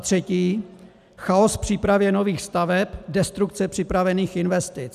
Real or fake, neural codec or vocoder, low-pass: real; none; 14.4 kHz